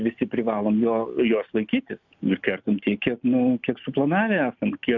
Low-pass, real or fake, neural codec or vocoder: 7.2 kHz; real; none